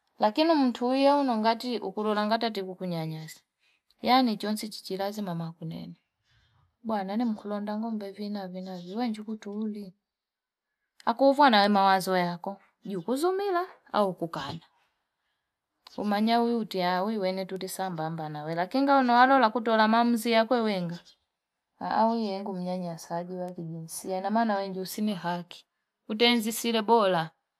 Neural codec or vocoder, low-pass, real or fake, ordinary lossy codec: none; 14.4 kHz; real; none